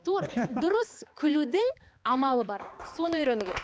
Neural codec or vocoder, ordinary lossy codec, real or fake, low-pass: codec, 16 kHz, 2 kbps, X-Codec, HuBERT features, trained on balanced general audio; none; fake; none